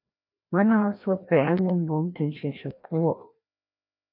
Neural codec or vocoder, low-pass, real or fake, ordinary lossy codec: codec, 16 kHz, 1 kbps, FreqCodec, larger model; 5.4 kHz; fake; AAC, 48 kbps